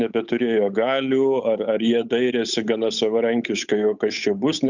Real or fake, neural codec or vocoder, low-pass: fake; codec, 16 kHz, 8 kbps, FunCodec, trained on Chinese and English, 25 frames a second; 7.2 kHz